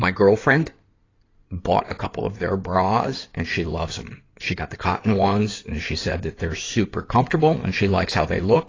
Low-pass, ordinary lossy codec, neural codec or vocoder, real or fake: 7.2 kHz; AAC, 32 kbps; codec, 16 kHz in and 24 kHz out, 2.2 kbps, FireRedTTS-2 codec; fake